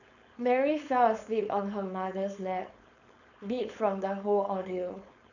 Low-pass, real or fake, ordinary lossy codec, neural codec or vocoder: 7.2 kHz; fake; none; codec, 16 kHz, 4.8 kbps, FACodec